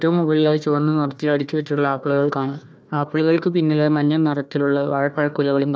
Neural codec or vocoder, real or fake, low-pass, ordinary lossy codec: codec, 16 kHz, 1 kbps, FunCodec, trained on Chinese and English, 50 frames a second; fake; none; none